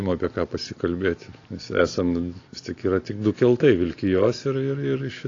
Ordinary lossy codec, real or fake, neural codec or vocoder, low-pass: AAC, 32 kbps; real; none; 7.2 kHz